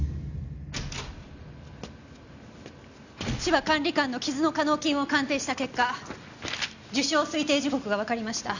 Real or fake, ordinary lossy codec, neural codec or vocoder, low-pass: real; none; none; 7.2 kHz